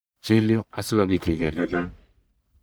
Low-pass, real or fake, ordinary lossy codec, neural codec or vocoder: none; fake; none; codec, 44.1 kHz, 1.7 kbps, Pupu-Codec